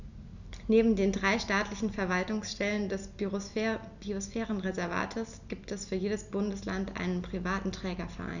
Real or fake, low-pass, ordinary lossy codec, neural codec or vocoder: real; 7.2 kHz; none; none